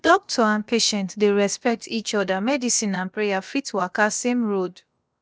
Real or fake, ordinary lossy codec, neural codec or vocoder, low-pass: fake; none; codec, 16 kHz, about 1 kbps, DyCAST, with the encoder's durations; none